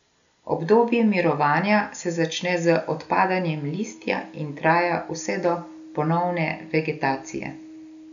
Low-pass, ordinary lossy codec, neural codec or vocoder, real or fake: 7.2 kHz; none; none; real